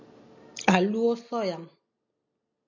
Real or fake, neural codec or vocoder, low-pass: real; none; 7.2 kHz